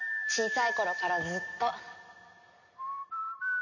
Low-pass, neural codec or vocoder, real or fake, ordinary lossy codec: 7.2 kHz; none; real; none